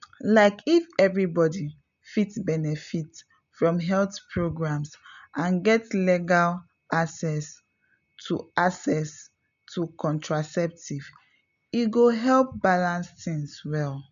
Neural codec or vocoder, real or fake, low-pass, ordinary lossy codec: none; real; 7.2 kHz; none